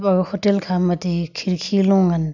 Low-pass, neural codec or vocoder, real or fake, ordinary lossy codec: 7.2 kHz; none; real; none